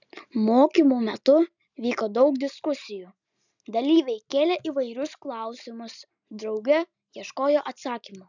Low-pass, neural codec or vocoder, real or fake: 7.2 kHz; none; real